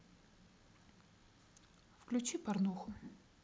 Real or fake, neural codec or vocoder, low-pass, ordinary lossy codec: real; none; none; none